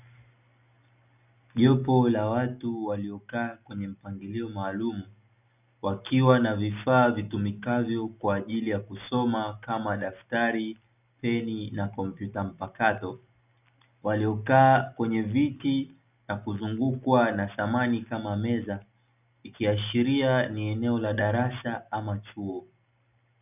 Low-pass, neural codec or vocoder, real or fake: 3.6 kHz; none; real